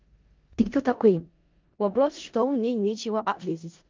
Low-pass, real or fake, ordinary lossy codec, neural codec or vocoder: 7.2 kHz; fake; Opus, 32 kbps; codec, 16 kHz in and 24 kHz out, 0.4 kbps, LongCat-Audio-Codec, four codebook decoder